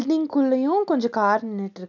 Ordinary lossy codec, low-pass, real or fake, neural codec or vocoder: none; 7.2 kHz; real; none